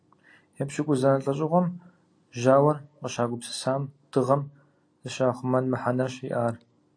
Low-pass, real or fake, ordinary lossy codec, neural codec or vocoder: 9.9 kHz; real; AAC, 48 kbps; none